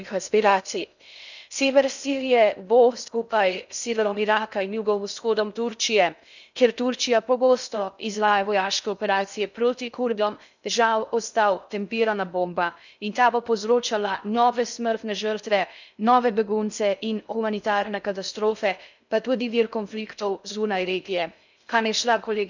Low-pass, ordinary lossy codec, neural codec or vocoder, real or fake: 7.2 kHz; none; codec, 16 kHz in and 24 kHz out, 0.6 kbps, FocalCodec, streaming, 2048 codes; fake